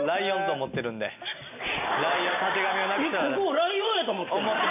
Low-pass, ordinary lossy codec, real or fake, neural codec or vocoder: 3.6 kHz; none; real; none